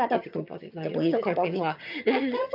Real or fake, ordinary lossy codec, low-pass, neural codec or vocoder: fake; none; 5.4 kHz; vocoder, 22.05 kHz, 80 mel bands, HiFi-GAN